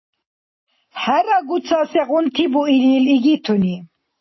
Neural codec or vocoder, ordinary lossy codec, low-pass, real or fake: none; MP3, 24 kbps; 7.2 kHz; real